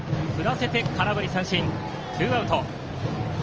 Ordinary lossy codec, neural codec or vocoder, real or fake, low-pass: Opus, 24 kbps; none; real; 7.2 kHz